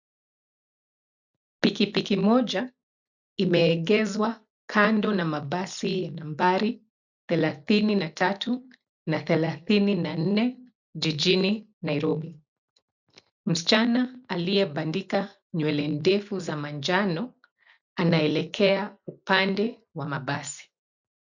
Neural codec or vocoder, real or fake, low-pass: none; real; 7.2 kHz